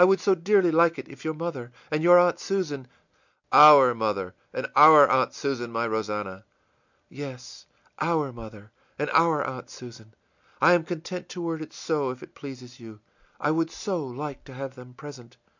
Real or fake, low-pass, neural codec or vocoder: real; 7.2 kHz; none